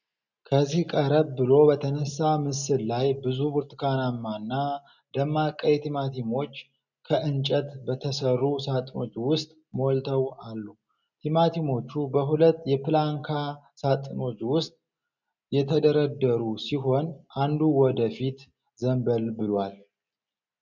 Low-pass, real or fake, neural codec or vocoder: 7.2 kHz; real; none